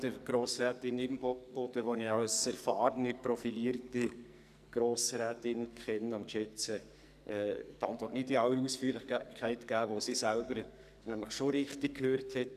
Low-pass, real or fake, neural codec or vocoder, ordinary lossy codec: 14.4 kHz; fake; codec, 44.1 kHz, 2.6 kbps, SNAC; none